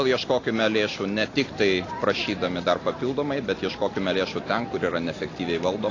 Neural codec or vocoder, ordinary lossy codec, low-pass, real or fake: none; AAC, 32 kbps; 7.2 kHz; real